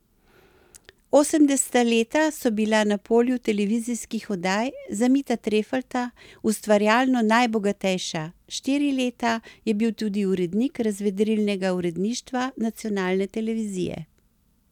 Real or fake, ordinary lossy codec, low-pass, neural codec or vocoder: real; none; 19.8 kHz; none